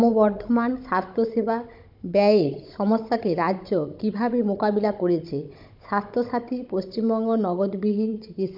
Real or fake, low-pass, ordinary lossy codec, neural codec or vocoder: fake; 5.4 kHz; MP3, 48 kbps; codec, 16 kHz, 16 kbps, FunCodec, trained on Chinese and English, 50 frames a second